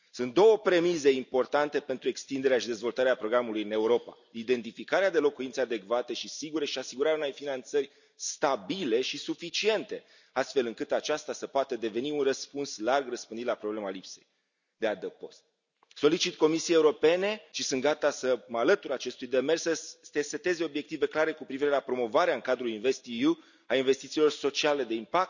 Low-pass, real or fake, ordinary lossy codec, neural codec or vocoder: 7.2 kHz; real; none; none